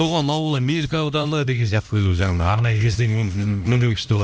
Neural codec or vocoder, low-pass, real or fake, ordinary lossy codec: codec, 16 kHz, 1 kbps, X-Codec, HuBERT features, trained on LibriSpeech; none; fake; none